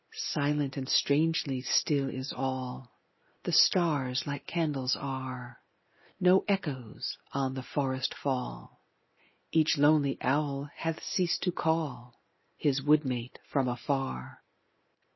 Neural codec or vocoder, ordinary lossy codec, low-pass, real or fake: none; MP3, 24 kbps; 7.2 kHz; real